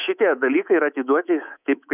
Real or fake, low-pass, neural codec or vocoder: fake; 3.6 kHz; codec, 24 kHz, 3.1 kbps, DualCodec